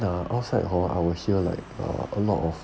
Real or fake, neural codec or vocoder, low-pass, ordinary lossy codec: real; none; none; none